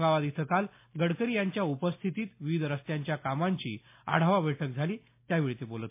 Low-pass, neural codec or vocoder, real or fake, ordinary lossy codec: 3.6 kHz; none; real; MP3, 24 kbps